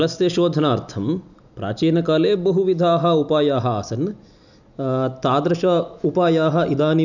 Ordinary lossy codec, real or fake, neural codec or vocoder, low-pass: none; real; none; 7.2 kHz